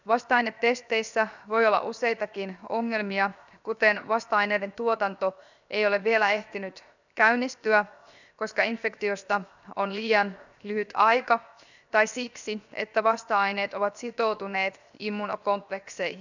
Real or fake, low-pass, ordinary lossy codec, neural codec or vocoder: fake; 7.2 kHz; none; codec, 16 kHz, 0.7 kbps, FocalCodec